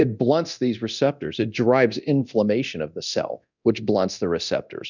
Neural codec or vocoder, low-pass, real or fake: codec, 24 kHz, 0.9 kbps, DualCodec; 7.2 kHz; fake